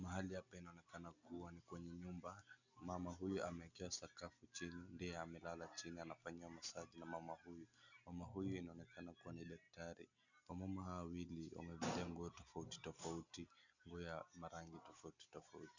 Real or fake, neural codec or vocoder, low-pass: real; none; 7.2 kHz